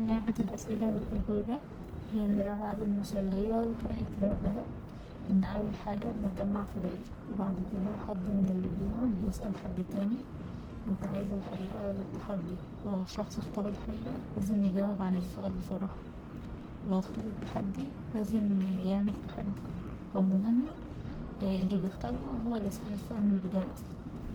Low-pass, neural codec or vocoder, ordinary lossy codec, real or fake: none; codec, 44.1 kHz, 1.7 kbps, Pupu-Codec; none; fake